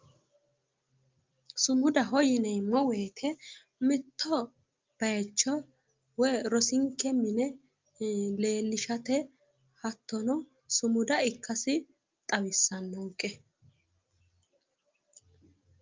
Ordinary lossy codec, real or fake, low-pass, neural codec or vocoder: Opus, 24 kbps; real; 7.2 kHz; none